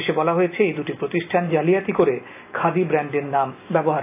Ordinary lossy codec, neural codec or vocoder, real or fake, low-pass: AAC, 24 kbps; none; real; 3.6 kHz